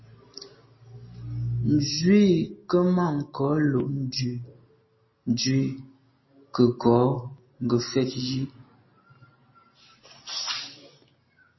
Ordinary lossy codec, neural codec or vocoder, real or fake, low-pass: MP3, 24 kbps; none; real; 7.2 kHz